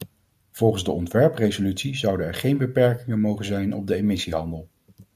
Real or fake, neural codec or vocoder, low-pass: real; none; 14.4 kHz